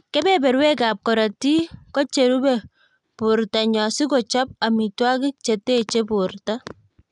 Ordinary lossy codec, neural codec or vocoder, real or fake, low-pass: none; none; real; 10.8 kHz